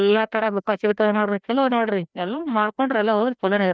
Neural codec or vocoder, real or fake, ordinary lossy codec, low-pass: codec, 16 kHz, 1 kbps, FreqCodec, larger model; fake; none; none